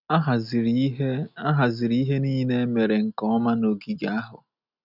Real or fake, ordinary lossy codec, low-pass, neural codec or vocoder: real; none; 5.4 kHz; none